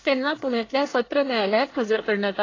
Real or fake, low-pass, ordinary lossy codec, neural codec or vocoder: fake; 7.2 kHz; AAC, 32 kbps; codec, 24 kHz, 1 kbps, SNAC